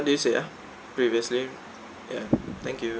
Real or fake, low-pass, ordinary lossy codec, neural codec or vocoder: real; none; none; none